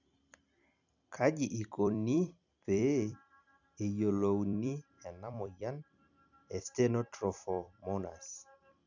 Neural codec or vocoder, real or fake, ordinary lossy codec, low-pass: vocoder, 44.1 kHz, 128 mel bands every 256 samples, BigVGAN v2; fake; none; 7.2 kHz